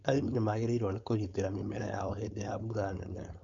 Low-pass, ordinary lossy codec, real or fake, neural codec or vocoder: 7.2 kHz; MP3, 48 kbps; fake; codec, 16 kHz, 4.8 kbps, FACodec